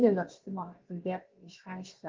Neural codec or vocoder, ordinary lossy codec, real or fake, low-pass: codec, 16 kHz, about 1 kbps, DyCAST, with the encoder's durations; Opus, 16 kbps; fake; 7.2 kHz